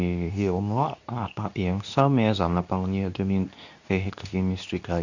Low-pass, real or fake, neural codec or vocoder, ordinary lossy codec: 7.2 kHz; fake; codec, 24 kHz, 0.9 kbps, WavTokenizer, medium speech release version 2; none